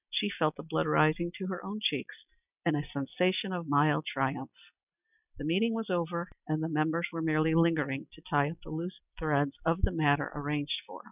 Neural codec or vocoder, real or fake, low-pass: none; real; 3.6 kHz